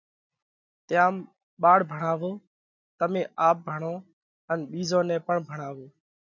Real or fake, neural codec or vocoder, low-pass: real; none; 7.2 kHz